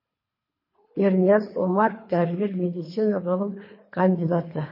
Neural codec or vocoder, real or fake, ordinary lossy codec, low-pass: codec, 24 kHz, 3 kbps, HILCodec; fake; MP3, 24 kbps; 5.4 kHz